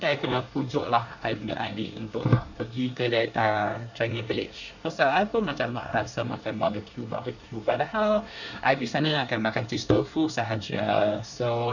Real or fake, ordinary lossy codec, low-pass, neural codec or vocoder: fake; none; 7.2 kHz; codec, 24 kHz, 1 kbps, SNAC